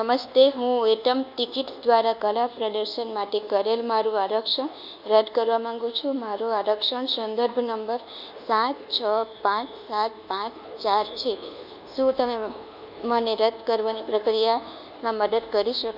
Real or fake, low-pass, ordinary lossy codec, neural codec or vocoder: fake; 5.4 kHz; none; codec, 24 kHz, 1.2 kbps, DualCodec